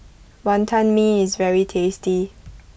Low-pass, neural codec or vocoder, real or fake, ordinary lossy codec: none; none; real; none